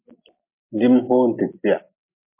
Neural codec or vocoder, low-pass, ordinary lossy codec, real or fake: none; 3.6 kHz; MP3, 24 kbps; real